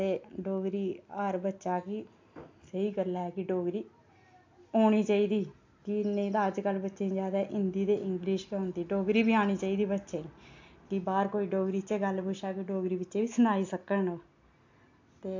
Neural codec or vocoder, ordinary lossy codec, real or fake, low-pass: none; AAC, 48 kbps; real; 7.2 kHz